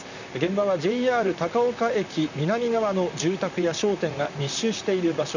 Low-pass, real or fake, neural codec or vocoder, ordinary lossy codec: 7.2 kHz; fake; vocoder, 44.1 kHz, 128 mel bands, Pupu-Vocoder; none